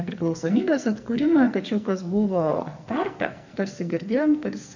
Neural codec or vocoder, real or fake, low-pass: codec, 44.1 kHz, 2.6 kbps, SNAC; fake; 7.2 kHz